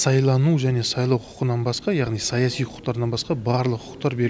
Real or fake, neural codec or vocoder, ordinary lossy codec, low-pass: real; none; none; none